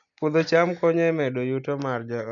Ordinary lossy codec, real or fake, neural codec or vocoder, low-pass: MP3, 64 kbps; real; none; 7.2 kHz